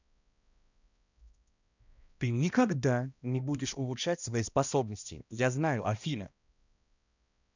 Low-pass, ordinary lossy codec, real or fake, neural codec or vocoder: 7.2 kHz; none; fake; codec, 16 kHz, 1 kbps, X-Codec, HuBERT features, trained on balanced general audio